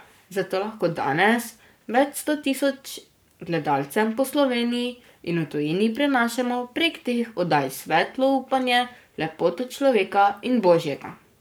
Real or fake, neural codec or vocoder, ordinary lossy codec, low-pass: fake; codec, 44.1 kHz, 7.8 kbps, Pupu-Codec; none; none